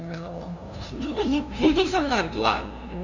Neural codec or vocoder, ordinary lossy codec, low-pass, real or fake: codec, 16 kHz, 0.5 kbps, FunCodec, trained on LibriTTS, 25 frames a second; none; 7.2 kHz; fake